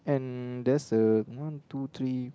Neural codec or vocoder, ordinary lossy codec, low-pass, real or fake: none; none; none; real